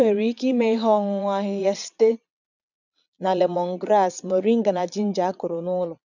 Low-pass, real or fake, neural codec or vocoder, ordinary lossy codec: 7.2 kHz; fake; vocoder, 22.05 kHz, 80 mel bands, Vocos; none